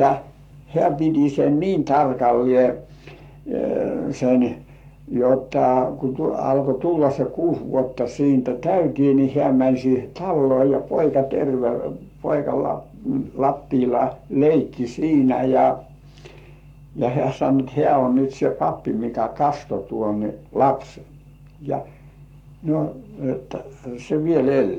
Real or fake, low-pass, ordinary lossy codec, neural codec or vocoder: fake; 19.8 kHz; none; codec, 44.1 kHz, 7.8 kbps, Pupu-Codec